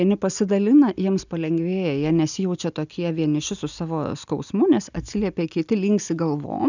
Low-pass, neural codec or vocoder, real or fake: 7.2 kHz; none; real